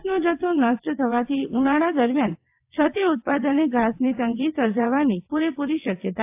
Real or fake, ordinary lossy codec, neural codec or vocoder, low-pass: fake; AAC, 32 kbps; vocoder, 22.05 kHz, 80 mel bands, WaveNeXt; 3.6 kHz